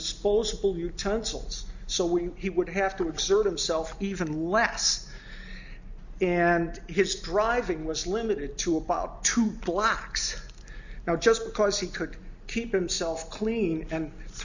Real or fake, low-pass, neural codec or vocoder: real; 7.2 kHz; none